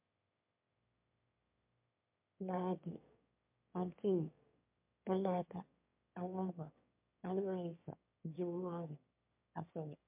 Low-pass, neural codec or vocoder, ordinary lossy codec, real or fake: 3.6 kHz; autoencoder, 22.05 kHz, a latent of 192 numbers a frame, VITS, trained on one speaker; MP3, 32 kbps; fake